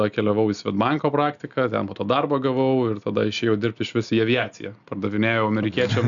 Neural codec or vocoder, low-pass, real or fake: none; 7.2 kHz; real